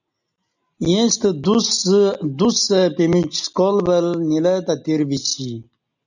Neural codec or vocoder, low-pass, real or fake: none; 7.2 kHz; real